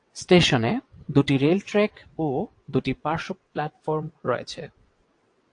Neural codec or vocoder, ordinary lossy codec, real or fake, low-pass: vocoder, 22.05 kHz, 80 mel bands, WaveNeXt; AAC, 48 kbps; fake; 9.9 kHz